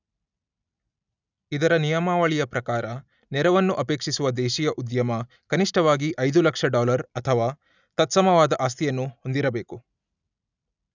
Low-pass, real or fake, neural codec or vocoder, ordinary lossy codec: 7.2 kHz; real; none; none